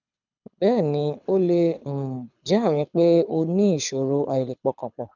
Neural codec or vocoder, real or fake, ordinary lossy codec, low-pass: codec, 24 kHz, 6 kbps, HILCodec; fake; none; 7.2 kHz